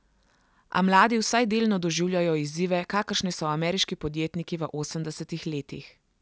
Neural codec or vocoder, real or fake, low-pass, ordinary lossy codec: none; real; none; none